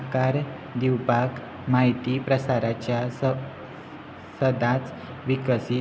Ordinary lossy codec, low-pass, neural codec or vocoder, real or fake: none; none; none; real